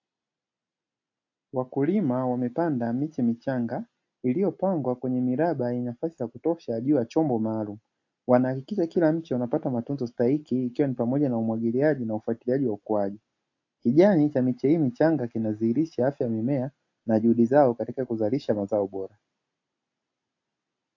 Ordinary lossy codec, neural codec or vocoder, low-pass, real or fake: AAC, 48 kbps; none; 7.2 kHz; real